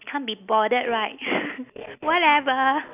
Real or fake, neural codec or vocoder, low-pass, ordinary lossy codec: real; none; 3.6 kHz; none